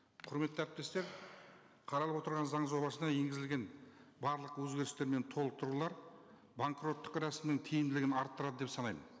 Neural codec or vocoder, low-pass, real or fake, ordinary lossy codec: none; none; real; none